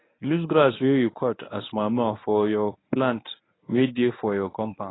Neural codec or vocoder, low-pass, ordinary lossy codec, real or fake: codec, 24 kHz, 0.9 kbps, WavTokenizer, medium speech release version 1; 7.2 kHz; AAC, 16 kbps; fake